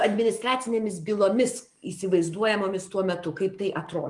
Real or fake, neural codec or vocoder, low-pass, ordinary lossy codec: real; none; 10.8 kHz; Opus, 32 kbps